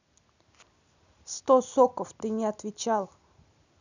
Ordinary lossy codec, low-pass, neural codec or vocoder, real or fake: none; 7.2 kHz; none; real